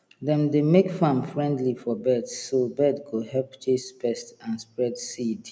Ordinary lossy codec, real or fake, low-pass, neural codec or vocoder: none; real; none; none